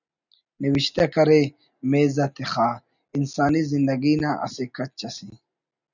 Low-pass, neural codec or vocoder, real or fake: 7.2 kHz; none; real